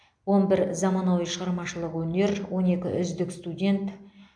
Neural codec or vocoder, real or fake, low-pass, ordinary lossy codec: none; real; 9.9 kHz; AAC, 64 kbps